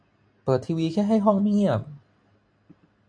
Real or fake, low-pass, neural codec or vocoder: real; 9.9 kHz; none